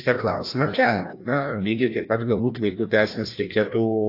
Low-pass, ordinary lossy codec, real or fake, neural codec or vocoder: 5.4 kHz; AAC, 32 kbps; fake; codec, 16 kHz, 1 kbps, FreqCodec, larger model